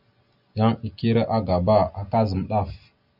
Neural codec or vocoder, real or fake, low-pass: none; real; 5.4 kHz